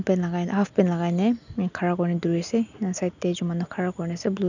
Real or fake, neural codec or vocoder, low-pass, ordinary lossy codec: real; none; 7.2 kHz; none